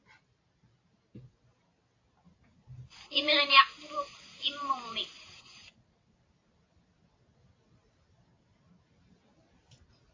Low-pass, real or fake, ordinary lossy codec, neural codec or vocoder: 7.2 kHz; real; AAC, 32 kbps; none